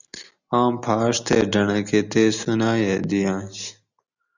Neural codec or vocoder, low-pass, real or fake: none; 7.2 kHz; real